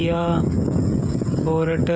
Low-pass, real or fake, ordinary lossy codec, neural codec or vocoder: none; fake; none; codec, 16 kHz, 16 kbps, FreqCodec, smaller model